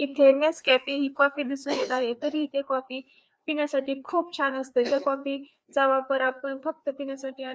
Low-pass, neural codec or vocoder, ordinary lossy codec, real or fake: none; codec, 16 kHz, 2 kbps, FreqCodec, larger model; none; fake